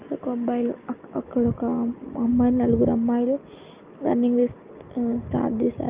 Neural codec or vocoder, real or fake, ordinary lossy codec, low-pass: none; real; Opus, 24 kbps; 3.6 kHz